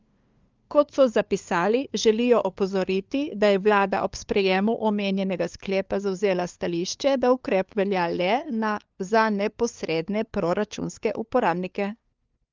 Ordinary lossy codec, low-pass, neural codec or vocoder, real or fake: Opus, 24 kbps; 7.2 kHz; codec, 16 kHz, 2 kbps, FunCodec, trained on LibriTTS, 25 frames a second; fake